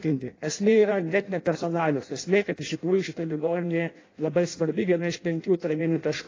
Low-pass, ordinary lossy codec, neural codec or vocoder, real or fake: 7.2 kHz; AAC, 32 kbps; codec, 16 kHz in and 24 kHz out, 0.6 kbps, FireRedTTS-2 codec; fake